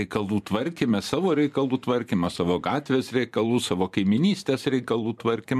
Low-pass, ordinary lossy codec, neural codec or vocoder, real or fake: 14.4 kHz; MP3, 96 kbps; vocoder, 44.1 kHz, 128 mel bands every 512 samples, BigVGAN v2; fake